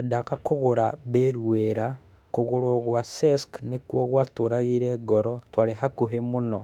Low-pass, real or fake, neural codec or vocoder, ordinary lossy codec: 19.8 kHz; fake; autoencoder, 48 kHz, 32 numbers a frame, DAC-VAE, trained on Japanese speech; none